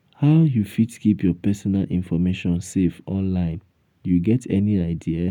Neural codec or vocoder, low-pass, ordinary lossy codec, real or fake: none; 19.8 kHz; none; real